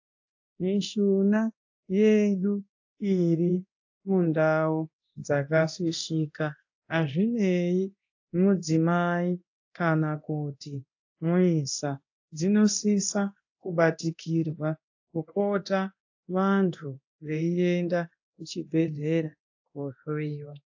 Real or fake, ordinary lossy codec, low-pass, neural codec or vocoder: fake; AAC, 48 kbps; 7.2 kHz; codec, 24 kHz, 0.9 kbps, DualCodec